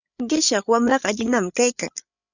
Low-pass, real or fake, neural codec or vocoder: 7.2 kHz; fake; codec, 16 kHz, 8 kbps, FreqCodec, larger model